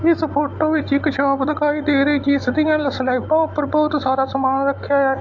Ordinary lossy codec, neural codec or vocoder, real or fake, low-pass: AAC, 48 kbps; none; real; 7.2 kHz